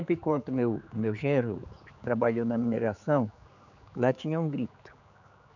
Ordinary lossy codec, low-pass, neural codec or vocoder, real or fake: none; 7.2 kHz; codec, 16 kHz, 4 kbps, X-Codec, HuBERT features, trained on general audio; fake